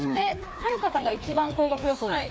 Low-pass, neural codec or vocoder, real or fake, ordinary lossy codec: none; codec, 16 kHz, 2 kbps, FreqCodec, larger model; fake; none